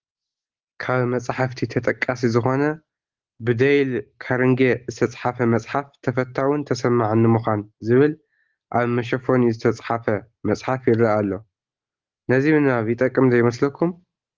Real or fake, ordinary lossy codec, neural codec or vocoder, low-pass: real; Opus, 16 kbps; none; 7.2 kHz